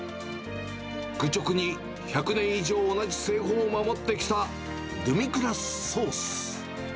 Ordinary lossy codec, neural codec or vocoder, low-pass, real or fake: none; none; none; real